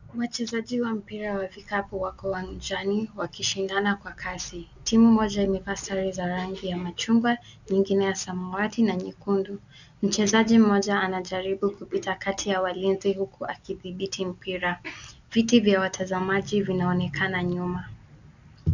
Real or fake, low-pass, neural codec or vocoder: real; 7.2 kHz; none